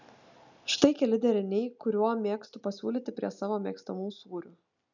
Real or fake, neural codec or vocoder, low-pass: real; none; 7.2 kHz